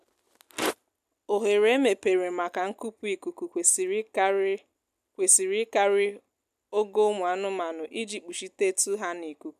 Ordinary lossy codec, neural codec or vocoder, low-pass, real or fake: none; none; 14.4 kHz; real